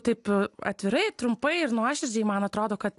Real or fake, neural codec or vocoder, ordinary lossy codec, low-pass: real; none; AAC, 64 kbps; 10.8 kHz